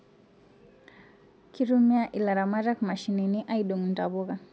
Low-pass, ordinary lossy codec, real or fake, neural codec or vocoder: none; none; real; none